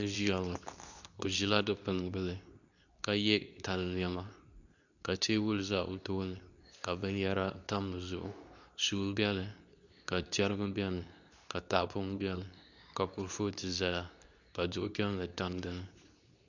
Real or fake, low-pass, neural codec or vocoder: fake; 7.2 kHz; codec, 24 kHz, 0.9 kbps, WavTokenizer, medium speech release version 2